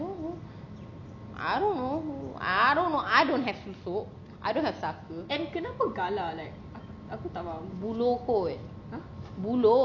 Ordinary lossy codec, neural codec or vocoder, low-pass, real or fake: none; none; 7.2 kHz; real